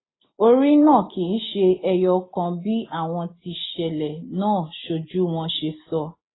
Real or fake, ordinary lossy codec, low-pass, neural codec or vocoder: real; AAC, 16 kbps; 7.2 kHz; none